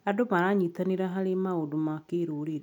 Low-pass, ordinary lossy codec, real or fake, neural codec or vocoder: 19.8 kHz; none; real; none